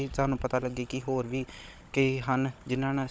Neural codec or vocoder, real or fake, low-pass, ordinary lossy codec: codec, 16 kHz, 16 kbps, FunCodec, trained on Chinese and English, 50 frames a second; fake; none; none